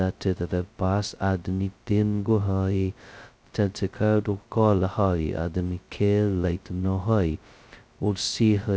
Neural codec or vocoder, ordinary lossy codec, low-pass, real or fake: codec, 16 kHz, 0.2 kbps, FocalCodec; none; none; fake